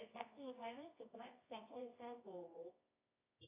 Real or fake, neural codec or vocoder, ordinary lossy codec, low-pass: fake; codec, 24 kHz, 0.9 kbps, WavTokenizer, medium music audio release; AAC, 24 kbps; 3.6 kHz